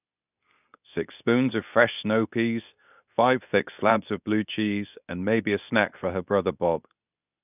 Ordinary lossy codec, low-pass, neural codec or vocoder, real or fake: none; 3.6 kHz; codec, 24 kHz, 0.9 kbps, WavTokenizer, medium speech release version 2; fake